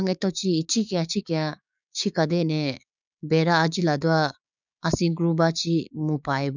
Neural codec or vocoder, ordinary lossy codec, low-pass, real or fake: codec, 16 kHz, 6 kbps, DAC; none; 7.2 kHz; fake